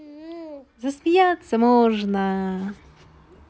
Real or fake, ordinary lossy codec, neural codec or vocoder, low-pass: real; none; none; none